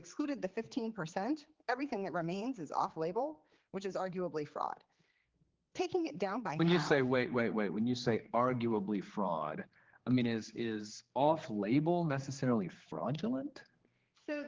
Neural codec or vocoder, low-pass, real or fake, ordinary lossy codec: codec, 16 kHz, 4 kbps, X-Codec, HuBERT features, trained on general audio; 7.2 kHz; fake; Opus, 16 kbps